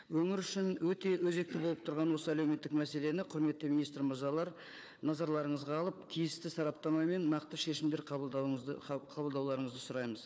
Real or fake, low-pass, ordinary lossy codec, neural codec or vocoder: fake; none; none; codec, 16 kHz, 4 kbps, FunCodec, trained on Chinese and English, 50 frames a second